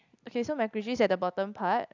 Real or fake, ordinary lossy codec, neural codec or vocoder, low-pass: real; none; none; 7.2 kHz